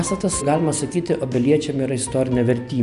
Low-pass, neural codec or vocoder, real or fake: 10.8 kHz; none; real